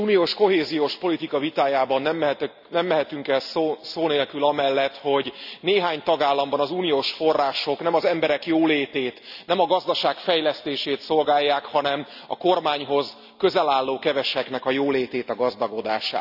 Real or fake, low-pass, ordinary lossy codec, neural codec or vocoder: real; 5.4 kHz; none; none